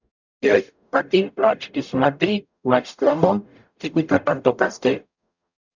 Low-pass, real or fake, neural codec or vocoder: 7.2 kHz; fake; codec, 44.1 kHz, 0.9 kbps, DAC